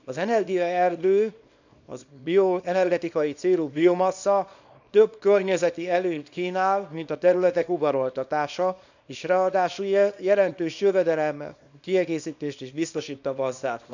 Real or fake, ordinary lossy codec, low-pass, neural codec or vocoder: fake; none; 7.2 kHz; codec, 24 kHz, 0.9 kbps, WavTokenizer, small release